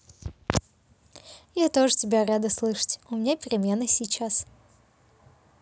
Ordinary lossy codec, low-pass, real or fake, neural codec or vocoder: none; none; real; none